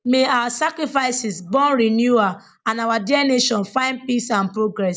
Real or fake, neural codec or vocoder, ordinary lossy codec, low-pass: real; none; none; none